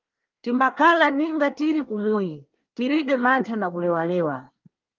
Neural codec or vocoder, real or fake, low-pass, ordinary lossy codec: codec, 24 kHz, 1 kbps, SNAC; fake; 7.2 kHz; Opus, 32 kbps